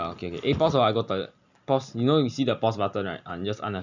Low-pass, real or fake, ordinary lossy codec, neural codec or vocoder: 7.2 kHz; real; none; none